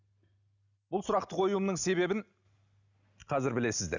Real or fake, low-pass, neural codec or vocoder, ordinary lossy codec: real; 7.2 kHz; none; none